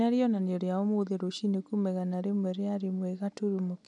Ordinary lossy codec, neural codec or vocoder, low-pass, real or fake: none; none; 10.8 kHz; real